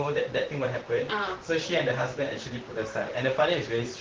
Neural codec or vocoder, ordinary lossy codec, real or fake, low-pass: vocoder, 44.1 kHz, 128 mel bands, Pupu-Vocoder; Opus, 16 kbps; fake; 7.2 kHz